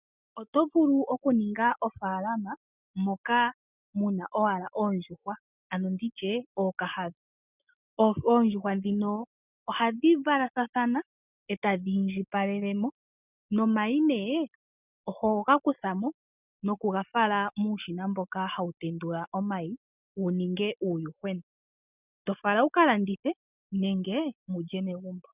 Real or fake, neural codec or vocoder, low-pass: real; none; 3.6 kHz